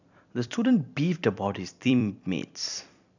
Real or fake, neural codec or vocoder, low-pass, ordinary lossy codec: fake; vocoder, 44.1 kHz, 128 mel bands every 256 samples, BigVGAN v2; 7.2 kHz; none